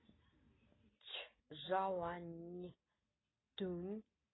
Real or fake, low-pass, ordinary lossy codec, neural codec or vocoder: real; 7.2 kHz; AAC, 16 kbps; none